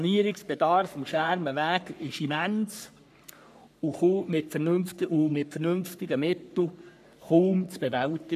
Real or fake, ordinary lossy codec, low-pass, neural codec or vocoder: fake; none; 14.4 kHz; codec, 44.1 kHz, 3.4 kbps, Pupu-Codec